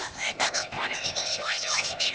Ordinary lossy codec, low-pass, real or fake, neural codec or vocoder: none; none; fake; codec, 16 kHz, 0.8 kbps, ZipCodec